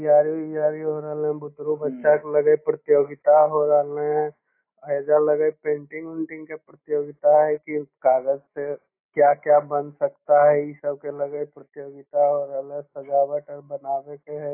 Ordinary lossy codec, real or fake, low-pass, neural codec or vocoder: AAC, 24 kbps; real; 3.6 kHz; none